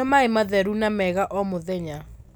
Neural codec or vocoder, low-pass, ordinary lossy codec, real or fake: none; none; none; real